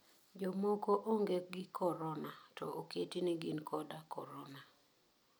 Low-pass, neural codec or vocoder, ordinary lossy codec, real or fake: none; none; none; real